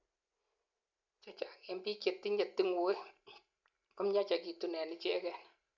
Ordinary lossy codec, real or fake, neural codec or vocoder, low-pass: none; real; none; 7.2 kHz